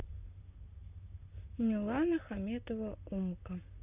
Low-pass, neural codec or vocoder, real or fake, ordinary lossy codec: 3.6 kHz; vocoder, 44.1 kHz, 128 mel bands, Pupu-Vocoder; fake; none